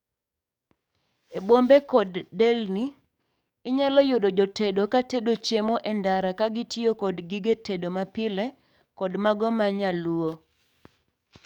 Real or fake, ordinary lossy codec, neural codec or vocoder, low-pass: fake; none; codec, 44.1 kHz, 7.8 kbps, DAC; 19.8 kHz